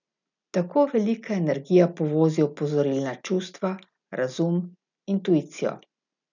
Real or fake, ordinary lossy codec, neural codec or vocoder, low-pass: real; none; none; 7.2 kHz